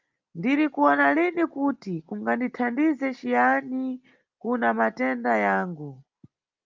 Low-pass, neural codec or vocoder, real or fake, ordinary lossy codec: 7.2 kHz; none; real; Opus, 32 kbps